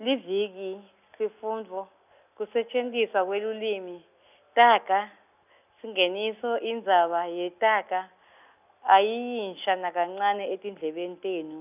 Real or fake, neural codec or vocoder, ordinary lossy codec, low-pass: real; none; none; 3.6 kHz